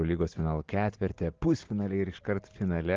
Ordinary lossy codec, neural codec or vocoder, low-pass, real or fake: Opus, 16 kbps; none; 7.2 kHz; real